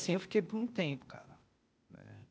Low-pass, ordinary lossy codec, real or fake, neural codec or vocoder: none; none; fake; codec, 16 kHz, 0.8 kbps, ZipCodec